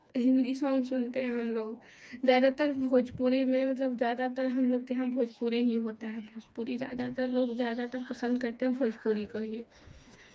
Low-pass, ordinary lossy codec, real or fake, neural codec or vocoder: none; none; fake; codec, 16 kHz, 2 kbps, FreqCodec, smaller model